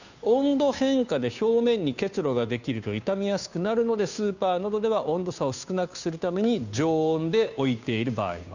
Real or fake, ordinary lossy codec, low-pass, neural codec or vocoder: fake; none; 7.2 kHz; codec, 16 kHz, 2 kbps, FunCodec, trained on Chinese and English, 25 frames a second